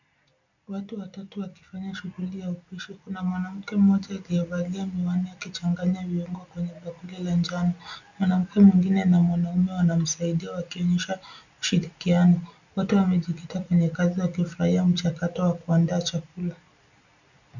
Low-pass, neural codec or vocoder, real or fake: 7.2 kHz; none; real